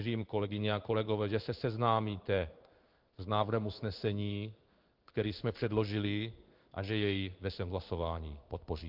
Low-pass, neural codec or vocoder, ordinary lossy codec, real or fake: 5.4 kHz; codec, 16 kHz in and 24 kHz out, 1 kbps, XY-Tokenizer; Opus, 24 kbps; fake